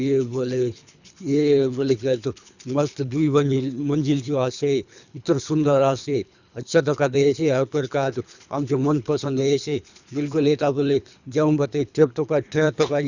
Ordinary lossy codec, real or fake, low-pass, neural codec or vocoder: none; fake; 7.2 kHz; codec, 24 kHz, 3 kbps, HILCodec